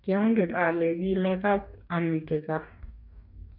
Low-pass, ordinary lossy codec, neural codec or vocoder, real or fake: 5.4 kHz; none; codec, 44.1 kHz, 2.6 kbps, DAC; fake